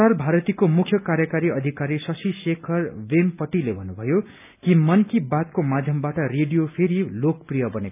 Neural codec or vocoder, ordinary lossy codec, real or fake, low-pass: none; none; real; 3.6 kHz